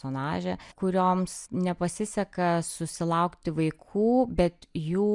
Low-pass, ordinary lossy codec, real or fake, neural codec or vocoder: 10.8 kHz; MP3, 96 kbps; real; none